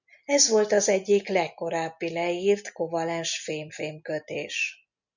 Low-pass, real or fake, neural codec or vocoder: 7.2 kHz; real; none